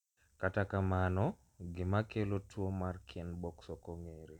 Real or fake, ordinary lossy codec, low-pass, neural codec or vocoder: real; none; 19.8 kHz; none